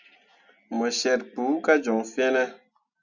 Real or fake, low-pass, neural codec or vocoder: real; 7.2 kHz; none